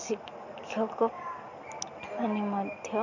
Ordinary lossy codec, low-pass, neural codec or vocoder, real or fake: none; 7.2 kHz; none; real